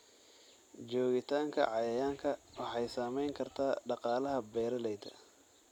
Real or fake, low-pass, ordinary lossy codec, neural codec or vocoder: real; 19.8 kHz; none; none